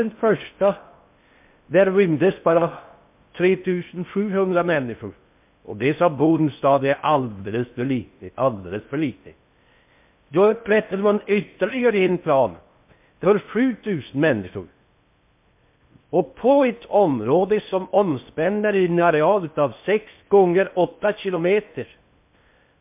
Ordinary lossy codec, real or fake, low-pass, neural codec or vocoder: MP3, 32 kbps; fake; 3.6 kHz; codec, 16 kHz in and 24 kHz out, 0.6 kbps, FocalCodec, streaming, 4096 codes